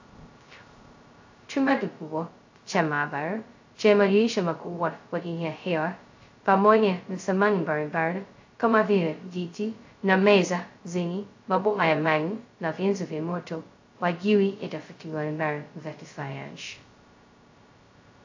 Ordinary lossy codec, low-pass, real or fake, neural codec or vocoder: AAC, 48 kbps; 7.2 kHz; fake; codec, 16 kHz, 0.2 kbps, FocalCodec